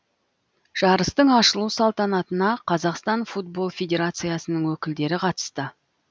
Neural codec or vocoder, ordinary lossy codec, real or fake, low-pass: none; none; real; none